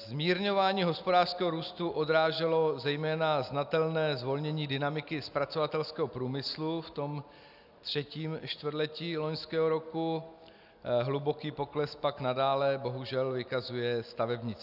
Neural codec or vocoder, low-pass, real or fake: none; 5.4 kHz; real